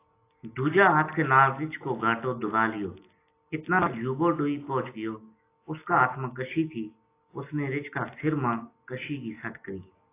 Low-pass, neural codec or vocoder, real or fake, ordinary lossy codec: 3.6 kHz; none; real; AAC, 24 kbps